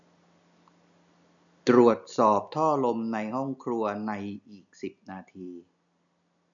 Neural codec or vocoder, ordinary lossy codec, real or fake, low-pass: none; none; real; 7.2 kHz